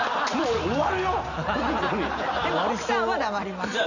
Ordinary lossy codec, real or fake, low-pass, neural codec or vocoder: none; real; 7.2 kHz; none